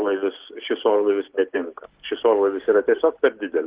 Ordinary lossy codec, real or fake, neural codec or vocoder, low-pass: Opus, 16 kbps; real; none; 3.6 kHz